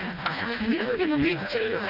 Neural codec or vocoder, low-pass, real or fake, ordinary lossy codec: codec, 16 kHz, 1 kbps, FreqCodec, smaller model; 5.4 kHz; fake; none